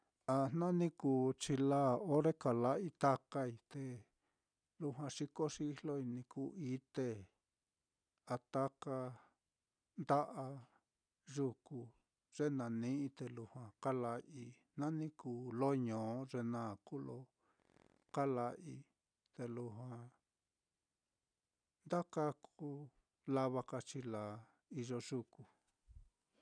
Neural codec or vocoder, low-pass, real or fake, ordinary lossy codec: none; 9.9 kHz; real; none